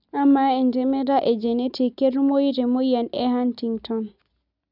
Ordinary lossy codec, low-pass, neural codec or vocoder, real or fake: none; 5.4 kHz; none; real